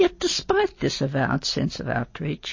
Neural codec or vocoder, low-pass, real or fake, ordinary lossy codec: none; 7.2 kHz; real; MP3, 32 kbps